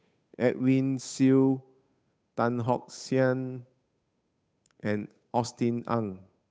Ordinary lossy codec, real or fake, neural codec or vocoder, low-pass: none; fake; codec, 16 kHz, 8 kbps, FunCodec, trained on Chinese and English, 25 frames a second; none